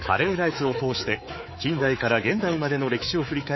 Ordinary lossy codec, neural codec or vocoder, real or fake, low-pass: MP3, 24 kbps; codec, 24 kHz, 3.1 kbps, DualCodec; fake; 7.2 kHz